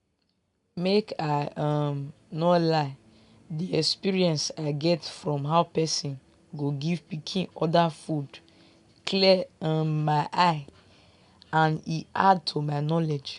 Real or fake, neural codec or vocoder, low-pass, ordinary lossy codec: real; none; 10.8 kHz; none